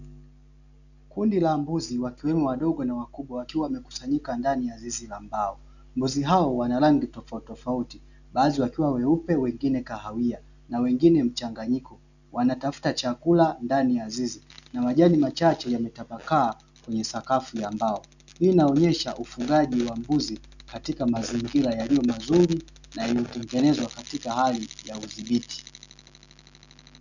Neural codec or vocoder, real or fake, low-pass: none; real; 7.2 kHz